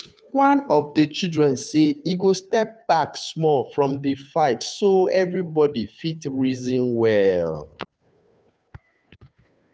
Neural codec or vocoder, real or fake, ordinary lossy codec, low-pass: codec, 16 kHz, 2 kbps, FunCodec, trained on Chinese and English, 25 frames a second; fake; none; none